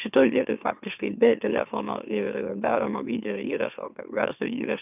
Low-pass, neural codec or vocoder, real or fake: 3.6 kHz; autoencoder, 44.1 kHz, a latent of 192 numbers a frame, MeloTTS; fake